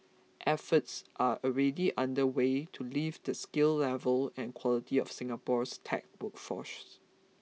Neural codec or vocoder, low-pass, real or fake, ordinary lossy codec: none; none; real; none